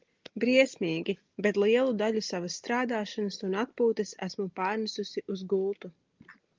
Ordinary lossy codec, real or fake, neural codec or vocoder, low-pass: Opus, 32 kbps; real; none; 7.2 kHz